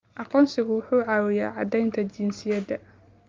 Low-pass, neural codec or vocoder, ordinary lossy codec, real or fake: 7.2 kHz; none; Opus, 32 kbps; real